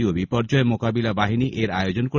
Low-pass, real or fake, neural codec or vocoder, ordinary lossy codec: 7.2 kHz; real; none; none